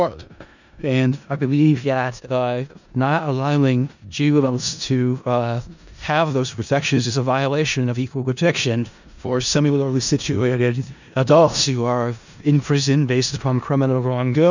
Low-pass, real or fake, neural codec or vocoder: 7.2 kHz; fake; codec, 16 kHz in and 24 kHz out, 0.4 kbps, LongCat-Audio-Codec, four codebook decoder